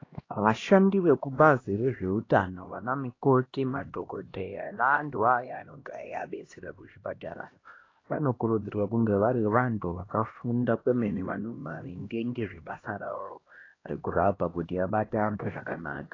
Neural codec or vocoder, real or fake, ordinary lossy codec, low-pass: codec, 16 kHz, 1 kbps, X-Codec, HuBERT features, trained on LibriSpeech; fake; AAC, 32 kbps; 7.2 kHz